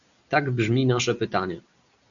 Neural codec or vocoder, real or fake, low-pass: none; real; 7.2 kHz